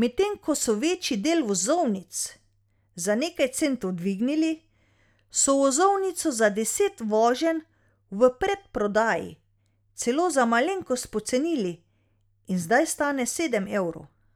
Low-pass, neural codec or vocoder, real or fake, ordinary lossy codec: 19.8 kHz; none; real; none